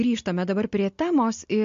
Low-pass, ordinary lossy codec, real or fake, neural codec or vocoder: 7.2 kHz; MP3, 48 kbps; real; none